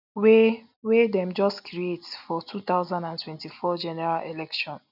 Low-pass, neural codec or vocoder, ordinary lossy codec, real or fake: 5.4 kHz; none; none; real